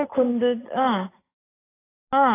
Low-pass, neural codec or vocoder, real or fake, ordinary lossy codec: 3.6 kHz; none; real; AAC, 16 kbps